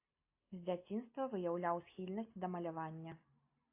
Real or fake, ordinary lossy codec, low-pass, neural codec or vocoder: real; AAC, 24 kbps; 3.6 kHz; none